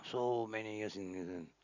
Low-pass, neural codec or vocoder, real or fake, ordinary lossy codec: 7.2 kHz; none; real; none